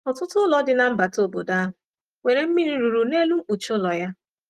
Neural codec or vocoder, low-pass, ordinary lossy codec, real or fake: none; 14.4 kHz; Opus, 24 kbps; real